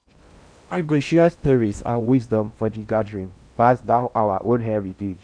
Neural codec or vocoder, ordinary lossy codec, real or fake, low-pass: codec, 16 kHz in and 24 kHz out, 0.6 kbps, FocalCodec, streaming, 2048 codes; none; fake; 9.9 kHz